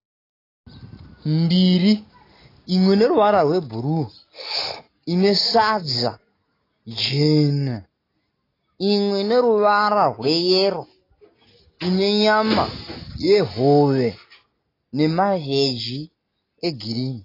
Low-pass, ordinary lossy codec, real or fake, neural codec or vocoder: 5.4 kHz; AAC, 24 kbps; real; none